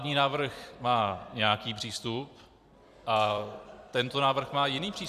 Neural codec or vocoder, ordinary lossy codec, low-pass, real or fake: vocoder, 44.1 kHz, 128 mel bands every 256 samples, BigVGAN v2; Opus, 64 kbps; 14.4 kHz; fake